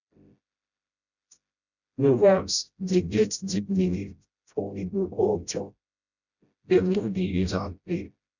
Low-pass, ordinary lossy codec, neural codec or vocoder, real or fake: 7.2 kHz; none; codec, 16 kHz, 0.5 kbps, FreqCodec, smaller model; fake